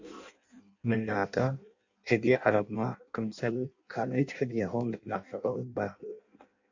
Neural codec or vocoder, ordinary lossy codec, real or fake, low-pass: codec, 16 kHz in and 24 kHz out, 0.6 kbps, FireRedTTS-2 codec; AAC, 48 kbps; fake; 7.2 kHz